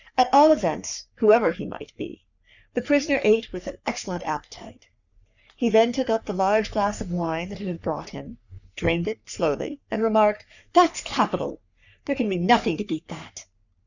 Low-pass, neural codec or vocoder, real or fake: 7.2 kHz; codec, 44.1 kHz, 3.4 kbps, Pupu-Codec; fake